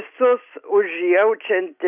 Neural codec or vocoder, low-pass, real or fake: none; 3.6 kHz; real